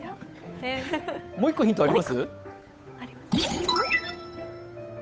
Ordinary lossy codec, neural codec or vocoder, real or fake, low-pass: none; codec, 16 kHz, 8 kbps, FunCodec, trained on Chinese and English, 25 frames a second; fake; none